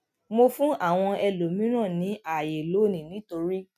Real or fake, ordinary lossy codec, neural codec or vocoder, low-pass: real; none; none; 14.4 kHz